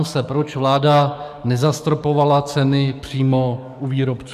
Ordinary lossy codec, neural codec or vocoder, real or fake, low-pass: MP3, 96 kbps; codec, 44.1 kHz, 7.8 kbps, DAC; fake; 14.4 kHz